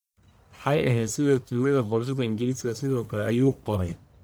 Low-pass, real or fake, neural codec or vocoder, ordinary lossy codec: none; fake; codec, 44.1 kHz, 1.7 kbps, Pupu-Codec; none